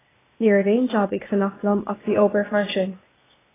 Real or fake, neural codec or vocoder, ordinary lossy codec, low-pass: fake; codec, 16 kHz, 0.8 kbps, ZipCodec; AAC, 16 kbps; 3.6 kHz